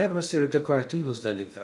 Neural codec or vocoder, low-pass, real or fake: codec, 16 kHz in and 24 kHz out, 0.6 kbps, FocalCodec, streaming, 2048 codes; 10.8 kHz; fake